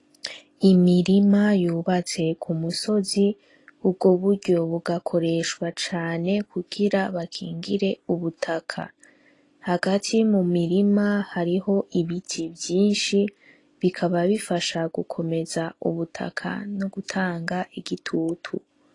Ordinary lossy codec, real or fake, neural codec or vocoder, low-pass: AAC, 32 kbps; real; none; 10.8 kHz